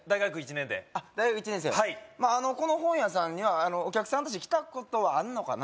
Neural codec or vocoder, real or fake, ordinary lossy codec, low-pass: none; real; none; none